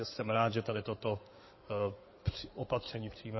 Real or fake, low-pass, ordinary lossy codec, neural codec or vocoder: fake; 7.2 kHz; MP3, 24 kbps; codec, 16 kHz in and 24 kHz out, 2.2 kbps, FireRedTTS-2 codec